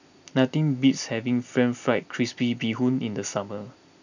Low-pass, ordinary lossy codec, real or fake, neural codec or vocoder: 7.2 kHz; none; real; none